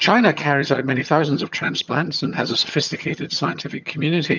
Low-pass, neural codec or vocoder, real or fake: 7.2 kHz; vocoder, 22.05 kHz, 80 mel bands, HiFi-GAN; fake